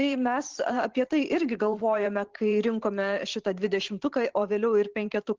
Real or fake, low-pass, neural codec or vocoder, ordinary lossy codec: fake; 7.2 kHz; vocoder, 44.1 kHz, 128 mel bands every 512 samples, BigVGAN v2; Opus, 16 kbps